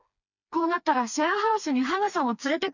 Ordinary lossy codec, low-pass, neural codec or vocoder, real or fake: none; 7.2 kHz; codec, 16 kHz, 2 kbps, FreqCodec, smaller model; fake